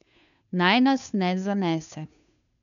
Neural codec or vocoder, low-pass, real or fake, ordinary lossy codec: codec, 16 kHz, 2 kbps, FunCodec, trained on Chinese and English, 25 frames a second; 7.2 kHz; fake; none